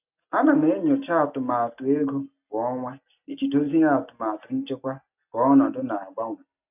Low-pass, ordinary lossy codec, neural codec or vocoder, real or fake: 3.6 kHz; none; none; real